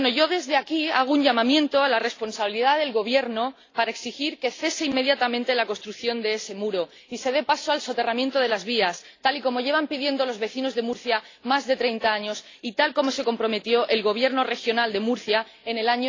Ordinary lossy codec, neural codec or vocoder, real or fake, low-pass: AAC, 32 kbps; none; real; 7.2 kHz